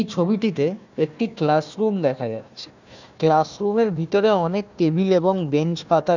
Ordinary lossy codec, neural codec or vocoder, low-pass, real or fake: none; codec, 16 kHz, 1 kbps, FunCodec, trained on Chinese and English, 50 frames a second; 7.2 kHz; fake